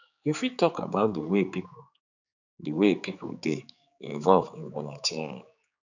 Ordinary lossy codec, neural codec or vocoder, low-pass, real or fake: none; codec, 16 kHz, 4 kbps, X-Codec, HuBERT features, trained on general audio; 7.2 kHz; fake